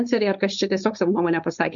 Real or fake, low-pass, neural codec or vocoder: fake; 7.2 kHz; codec, 16 kHz, 4.8 kbps, FACodec